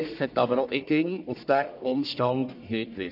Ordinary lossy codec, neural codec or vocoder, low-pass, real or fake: none; codec, 44.1 kHz, 1.7 kbps, Pupu-Codec; 5.4 kHz; fake